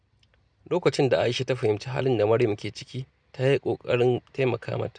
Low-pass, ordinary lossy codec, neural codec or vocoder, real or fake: 9.9 kHz; none; none; real